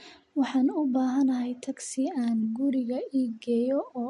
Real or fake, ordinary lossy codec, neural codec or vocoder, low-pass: real; MP3, 48 kbps; none; 14.4 kHz